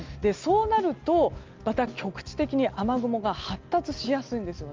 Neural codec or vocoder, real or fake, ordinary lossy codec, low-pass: none; real; Opus, 32 kbps; 7.2 kHz